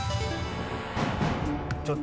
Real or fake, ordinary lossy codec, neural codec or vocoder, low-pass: real; none; none; none